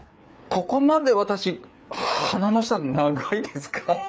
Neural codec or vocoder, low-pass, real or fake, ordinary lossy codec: codec, 16 kHz, 4 kbps, FreqCodec, larger model; none; fake; none